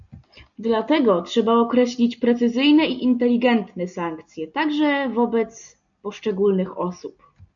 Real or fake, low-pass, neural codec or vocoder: real; 7.2 kHz; none